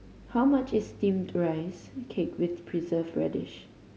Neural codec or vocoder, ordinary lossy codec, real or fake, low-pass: none; none; real; none